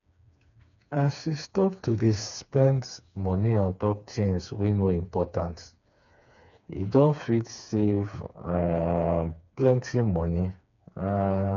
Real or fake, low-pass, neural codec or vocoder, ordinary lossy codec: fake; 7.2 kHz; codec, 16 kHz, 4 kbps, FreqCodec, smaller model; none